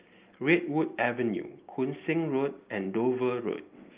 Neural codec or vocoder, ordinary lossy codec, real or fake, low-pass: none; Opus, 24 kbps; real; 3.6 kHz